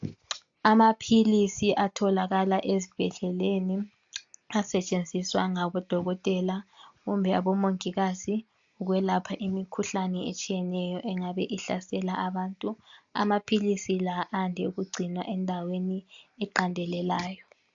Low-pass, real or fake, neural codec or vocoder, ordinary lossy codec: 7.2 kHz; real; none; AAC, 64 kbps